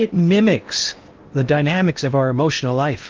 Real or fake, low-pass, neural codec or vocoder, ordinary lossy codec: fake; 7.2 kHz; codec, 16 kHz in and 24 kHz out, 0.8 kbps, FocalCodec, streaming, 65536 codes; Opus, 32 kbps